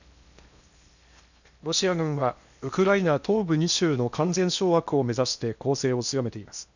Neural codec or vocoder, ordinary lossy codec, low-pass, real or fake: codec, 16 kHz in and 24 kHz out, 0.8 kbps, FocalCodec, streaming, 65536 codes; none; 7.2 kHz; fake